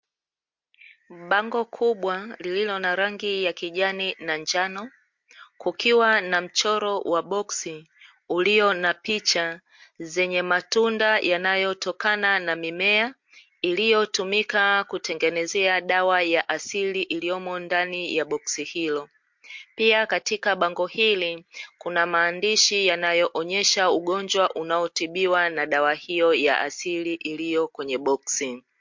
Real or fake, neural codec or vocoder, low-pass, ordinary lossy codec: real; none; 7.2 kHz; MP3, 48 kbps